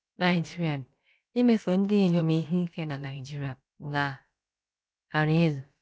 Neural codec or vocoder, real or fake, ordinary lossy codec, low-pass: codec, 16 kHz, about 1 kbps, DyCAST, with the encoder's durations; fake; none; none